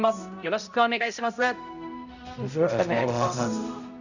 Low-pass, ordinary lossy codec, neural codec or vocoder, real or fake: 7.2 kHz; none; codec, 16 kHz, 0.5 kbps, X-Codec, HuBERT features, trained on balanced general audio; fake